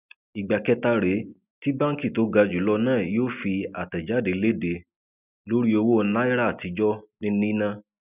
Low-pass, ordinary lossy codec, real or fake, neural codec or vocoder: 3.6 kHz; none; real; none